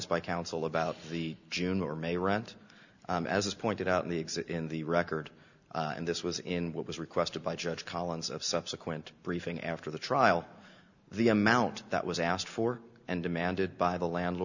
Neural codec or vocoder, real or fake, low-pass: none; real; 7.2 kHz